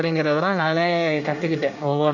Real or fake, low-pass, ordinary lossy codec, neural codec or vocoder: fake; 7.2 kHz; none; codec, 24 kHz, 1 kbps, SNAC